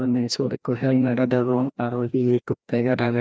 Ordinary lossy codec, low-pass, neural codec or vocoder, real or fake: none; none; codec, 16 kHz, 1 kbps, FreqCodec, larger model; fake